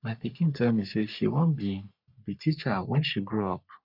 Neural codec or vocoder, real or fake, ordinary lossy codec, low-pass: codec, 44.1 kHz, 3.4 kbps, Pupu-Codec; fake; none; 5.4 kHz